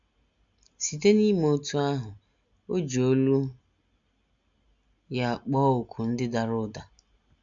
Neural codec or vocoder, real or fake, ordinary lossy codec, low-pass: none; real; MP3, 64 kbps; 7.2 kHz